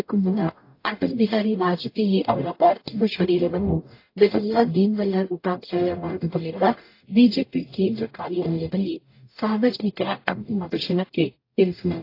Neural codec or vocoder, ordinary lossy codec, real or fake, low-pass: codec, 44.1 kHz, 0.9 kbps, DAC; AAC, 32 kbps; fake; 5.4 kHz